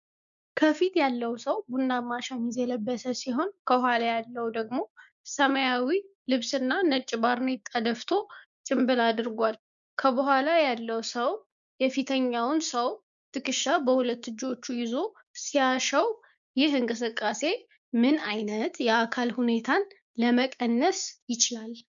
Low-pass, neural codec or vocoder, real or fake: 7.2 kHz; codec, 16 kHz, 6 kbps, DAC; fake